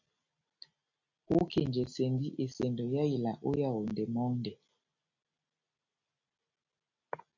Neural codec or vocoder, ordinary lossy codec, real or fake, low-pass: none; MP3, 64 kbps; real; 7.2 kHz